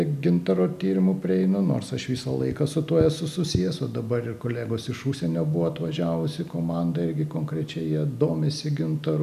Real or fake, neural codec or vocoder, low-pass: real; none; 14.4 kHz